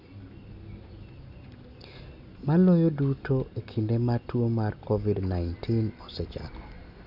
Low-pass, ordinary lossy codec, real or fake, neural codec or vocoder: 5.4 kHz; none; real; none